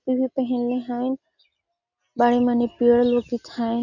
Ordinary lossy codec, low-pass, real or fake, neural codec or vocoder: none; 7.2 kHz; real; none